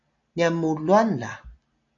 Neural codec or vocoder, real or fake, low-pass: none; real; 7.2 kHz